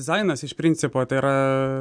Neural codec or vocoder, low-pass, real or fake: none; 9.9 kHz; real